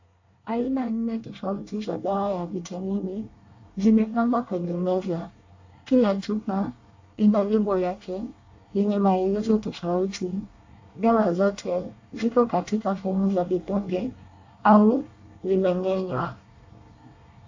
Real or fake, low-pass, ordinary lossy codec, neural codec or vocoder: fake; 7.2 kHz; AAC, 48 kbps; codec, 24 kHz, 1 kbps, SNAC